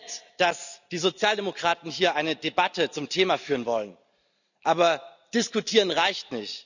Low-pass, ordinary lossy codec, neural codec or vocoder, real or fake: 7.2 kHz; none; none; real